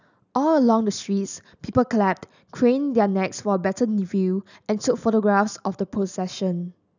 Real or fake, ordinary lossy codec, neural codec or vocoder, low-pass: real; none; none; 7.2 kHz